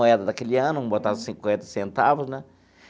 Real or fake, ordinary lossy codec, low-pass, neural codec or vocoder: real; none; none; none